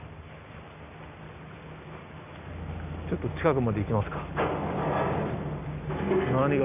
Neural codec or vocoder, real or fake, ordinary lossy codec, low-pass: none; real; none; 3.6 kHz